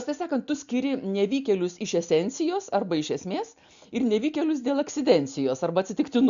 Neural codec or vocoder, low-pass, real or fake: none; 7.2 kHz; real